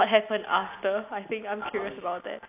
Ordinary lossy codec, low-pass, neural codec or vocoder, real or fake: AAC, 32 kbps; 3.6 kHz; none; real